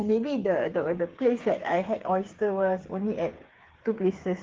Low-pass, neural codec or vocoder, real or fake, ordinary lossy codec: 7.2 kHz; codec, 16 kHz, 8 kbps, FreqCodec, smaller model; fake; Opus, 16 kbps